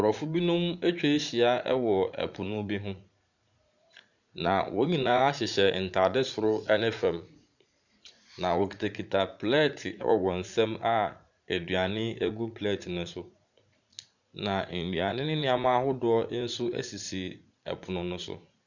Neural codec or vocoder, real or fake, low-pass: vocoder, 44.1 kHz, 80 mel bands, Vocos; fake; 7.2 kHz